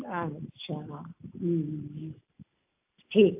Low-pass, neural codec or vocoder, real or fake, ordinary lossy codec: 3.6 kHz; none; real; AAC, 32 kbps